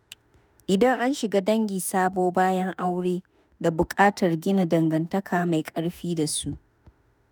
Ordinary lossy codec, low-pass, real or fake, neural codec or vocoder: none; none; fake; autoencoder, 48 kHz, 32 numbers a frame, DAC-VAE, trained on Japanese speech